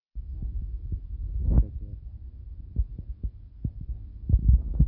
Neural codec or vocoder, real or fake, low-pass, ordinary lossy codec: none; real; 5.4 kHz; AAC, 32 kbps